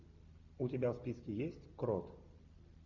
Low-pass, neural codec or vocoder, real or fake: 7.2 kHz; none; real